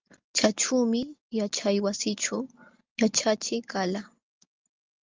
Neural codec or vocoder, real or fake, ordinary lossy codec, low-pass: none; real; Opus, 32 kbps; 7.2 kHz